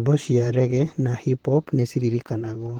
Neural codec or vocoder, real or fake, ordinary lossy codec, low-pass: vocoder, 44.1 kHz, 128 mel bands, Pupu-Vocoder; fake; Opus, 16 kbps; 19.8 kHz